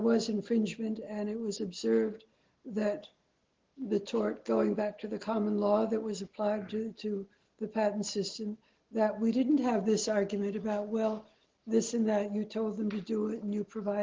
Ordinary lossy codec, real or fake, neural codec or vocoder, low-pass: Opus, 16 kbps; real; none; 7.2 kHz